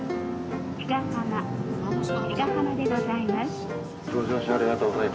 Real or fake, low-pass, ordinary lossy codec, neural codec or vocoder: real; none; none; none